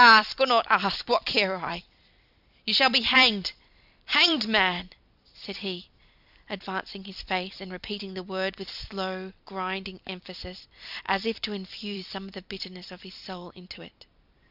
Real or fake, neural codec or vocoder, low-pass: fake; vocoder, 44.1 kHz, 128 mel bands every 256 samples, BigVGAN v2; 5.4 kHz